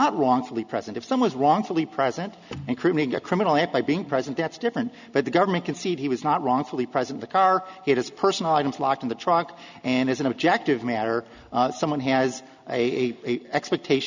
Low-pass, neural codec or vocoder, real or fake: 7.2 kHz; none; real